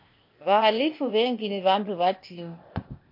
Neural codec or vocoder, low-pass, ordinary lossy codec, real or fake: codec, 16 kHz, 0.8 kbps, ZipCodec; 5.4 kHz; MP3, 32 kbps; fake